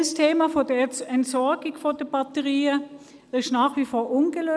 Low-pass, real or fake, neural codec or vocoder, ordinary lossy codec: none; real; none; none